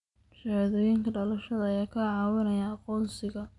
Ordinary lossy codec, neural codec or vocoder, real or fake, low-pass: none; none; real; 10.8 kHz